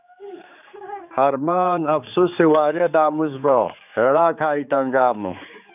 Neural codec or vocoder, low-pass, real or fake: codec, 16 kHz, 4 kbps, X-Codec, HuBERT features, trained on general audio; 3.6 kHz; fake